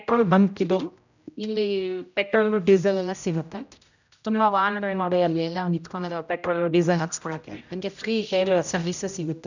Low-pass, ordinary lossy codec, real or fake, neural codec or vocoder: 7.2 kHz; none; fake; codec, 16 kHz, 0.5 kbps, X-Codec, HuBERT features, trained on general audio